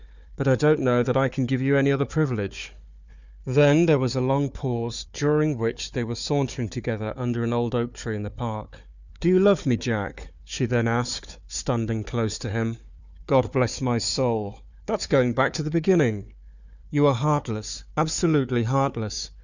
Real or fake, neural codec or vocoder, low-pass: fake; codec, 16 kHz, 4 kbps, FunCodec, trained on Chinese and English, 50 frames a second; 7.2 kHz